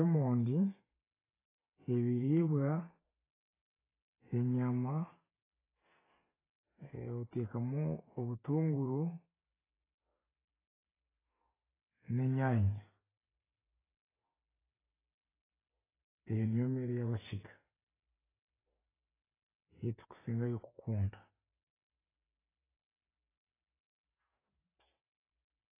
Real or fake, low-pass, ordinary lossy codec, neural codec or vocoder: real; 3.6 kHz; AAC, 16 kbps; none